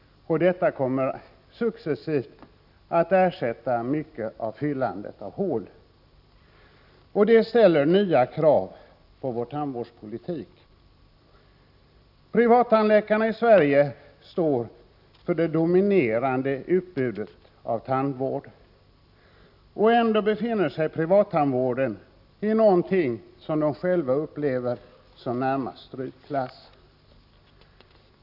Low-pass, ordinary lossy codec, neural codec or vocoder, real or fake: 5.4 kHz; none; none; real